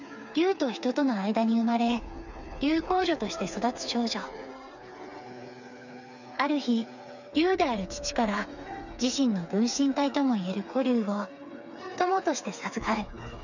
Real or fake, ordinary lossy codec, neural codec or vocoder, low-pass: fake; none; codec, 16 kHz, 4 kbps, FreqCodec, smaller model; 7.2 kHz